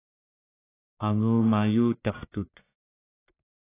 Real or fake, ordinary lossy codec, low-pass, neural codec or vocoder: fake; AAC, 16 kbps; 3.6 kHz; codec, 44.1 kHz, 1.7 kbps, Pupu-Codec